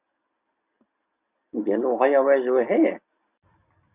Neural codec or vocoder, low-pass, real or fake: none; 3.6 kHz; real